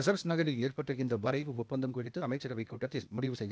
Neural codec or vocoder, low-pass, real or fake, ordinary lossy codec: codec, 16 kHz, 0.8 kbps, ZipCodec; none; fake; none